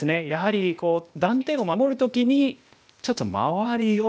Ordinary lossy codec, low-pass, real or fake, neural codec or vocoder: none; none; fake; codec, 16 kHz, 0.8 kbps, ZipCodec